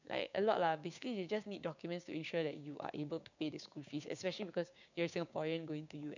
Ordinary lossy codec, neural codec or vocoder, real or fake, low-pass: none; codec, 16 kHz, 6 kbps, DAC; fake; 7.2 kHz